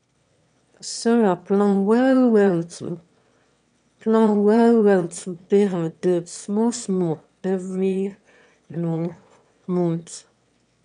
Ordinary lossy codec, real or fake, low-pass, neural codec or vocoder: none; fake; 9.9 kHz; autoencoder, 22.05 kHz, a latent of 192 numbers a frame, VITS, trained on one speaker